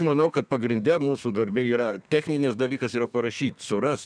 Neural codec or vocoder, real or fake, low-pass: codec, 32 kHz, 1.9 kbps, SNAC; fake; 9.9 kHz